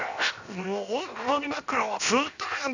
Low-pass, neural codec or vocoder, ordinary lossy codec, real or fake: 7.2 kHz; codec, 16 kHz, 0.7 kbps, FocalCodec; none; fake